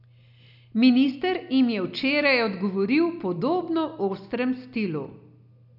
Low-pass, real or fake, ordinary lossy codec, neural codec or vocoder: 5.4 kHz; real; none; none